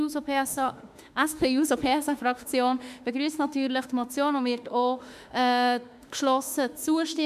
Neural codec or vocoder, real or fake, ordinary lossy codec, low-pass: autoencoder, 48 kHz, 32 numbers a frame, DAC-VAE, trained on Japanese speech; fake; none; 14.4 kHz